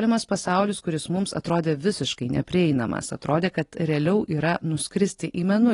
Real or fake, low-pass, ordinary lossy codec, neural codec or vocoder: real; 10.8 kHz; AAC, 32 kbps; none